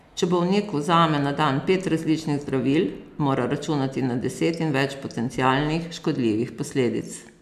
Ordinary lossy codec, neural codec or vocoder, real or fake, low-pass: none; none; real; 14.4 kHz